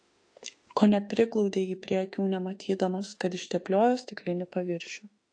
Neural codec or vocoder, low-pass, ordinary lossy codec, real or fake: autoencoder, 48 kHz, 32 numbers a frame, DAC-VAE, trained on Japanese speech; 9.9 kHz; AAC, 48 kbps; fake